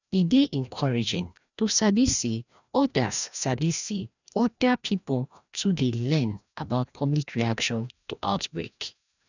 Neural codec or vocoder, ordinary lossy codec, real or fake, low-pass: codec, 16 kHz, 1 kbps, FreqCodec, larger model; none; fake; 7.2 kHz